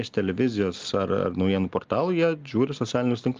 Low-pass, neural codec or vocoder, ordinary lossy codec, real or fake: 7.2 kHz; none; Opus, 32 kbps; real